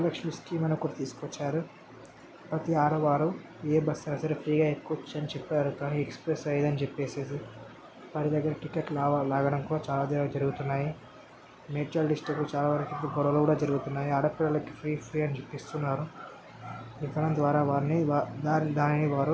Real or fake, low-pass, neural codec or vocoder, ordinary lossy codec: real; none; none; none